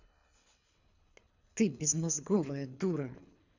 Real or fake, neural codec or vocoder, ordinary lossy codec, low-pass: fake; codec, 24 kHz, 3 kbps, HILCodec; none; 7.2 kHz